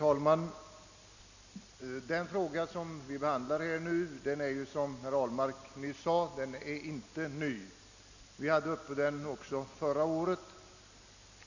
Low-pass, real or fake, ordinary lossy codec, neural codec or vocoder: 7.2 kHz; real; none; none